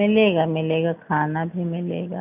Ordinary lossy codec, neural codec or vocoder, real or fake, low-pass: none; none; real; 3.6 kHz